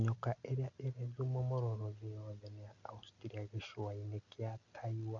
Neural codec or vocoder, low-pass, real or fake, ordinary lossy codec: none; 7.2 kHz; real; none